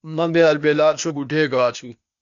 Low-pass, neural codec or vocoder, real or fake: 7.2 kHz; codec, 16 kHz, 0.8 kbps, ZipCodec; fake